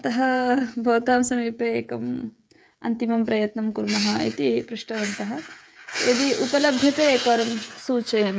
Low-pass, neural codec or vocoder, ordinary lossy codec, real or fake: none; codec, 16 kHz, 16 kbps, FreqCodec, smaller model; none; fake